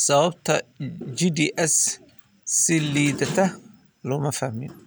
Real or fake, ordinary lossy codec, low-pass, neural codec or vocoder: real; none; none; none